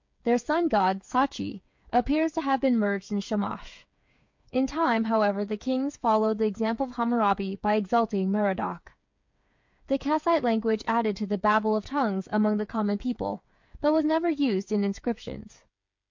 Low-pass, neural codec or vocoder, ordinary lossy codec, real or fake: 7.2 kHz; codec, 16 kHz, 8 kbps, FreqCodec, smaller model; MP3, 48 kbps; fake